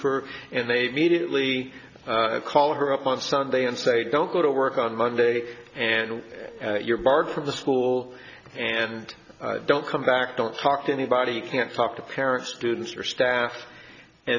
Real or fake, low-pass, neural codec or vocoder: real; 7.2 kHz; none